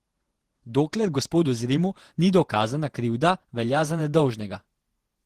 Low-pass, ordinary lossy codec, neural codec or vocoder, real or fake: 14.4 kHz; Opus, 16 kbps; vocoder, 48 kHz, 128 mel bands, Vocos; fake